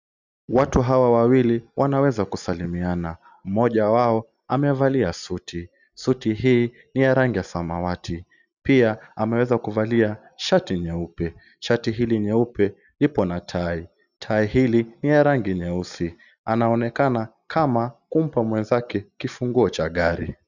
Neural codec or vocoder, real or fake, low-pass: none; real; 7.2 kHz